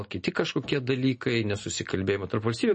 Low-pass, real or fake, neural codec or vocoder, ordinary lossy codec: 9.9 kHz; real; none; MP3, 32 kbps